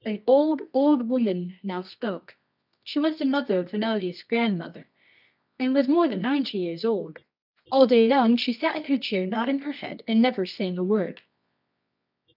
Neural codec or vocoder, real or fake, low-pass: codec, 24 kHz, 0.9 kbps, WavTokenizer, medium music audio release; fake; 5.4 kHz